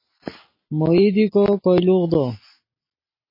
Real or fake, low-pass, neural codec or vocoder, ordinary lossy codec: real; 5.4 kHz; none; MP3, 24 kbps